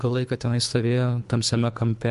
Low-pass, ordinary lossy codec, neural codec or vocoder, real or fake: 10.8 kHz; MP3, 64 kbps; codec, 24 kHz, 3 kbps, HILCodec; fake